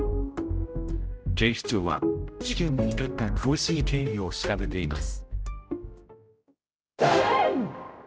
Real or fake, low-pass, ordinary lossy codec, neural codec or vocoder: fake; none; none; codec, 16 kHz, 0.5 kbps, X-Codec, HuBERT features, trained on general audio